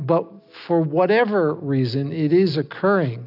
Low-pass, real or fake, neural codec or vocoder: 5.4 kHz; real; none